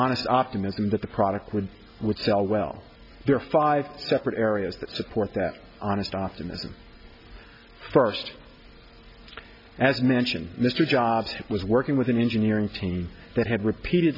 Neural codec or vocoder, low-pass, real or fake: none; 5.4 kHz; real